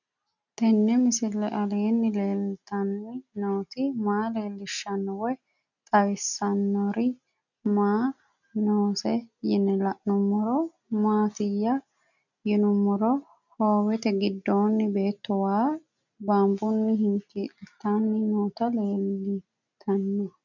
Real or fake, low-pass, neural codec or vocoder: real; 7.2 kHz; none